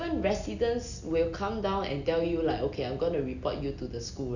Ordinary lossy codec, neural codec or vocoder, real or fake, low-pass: none; none; real; 7.2 kHz